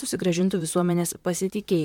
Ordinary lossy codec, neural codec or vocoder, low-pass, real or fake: MP3, 96 kbps; vocoder, 44.1 kHz, 128 mel bands, Pupu-Vocoder; 19.8 kHz; fake